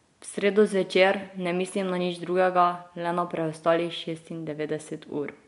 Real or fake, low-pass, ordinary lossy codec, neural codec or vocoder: real; 10.8 kHz; MP3, 64 kbps; none